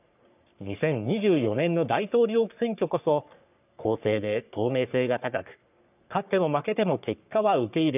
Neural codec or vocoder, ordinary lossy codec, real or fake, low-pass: codec, 44.1 kHz, 3.4 kbps, Pupu-Codec; none; fake; 3.6 kHz